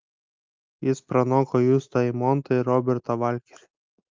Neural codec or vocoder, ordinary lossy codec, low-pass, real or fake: none; Opus, 24 kbps; 7.2 kHz; real